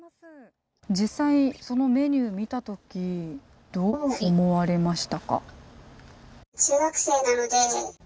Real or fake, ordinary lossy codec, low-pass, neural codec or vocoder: real; none; none; none